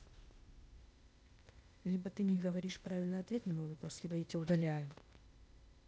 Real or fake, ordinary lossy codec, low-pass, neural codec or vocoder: fake; none; none; codec, 16 kHz, 0.8 kbps, ZipCodec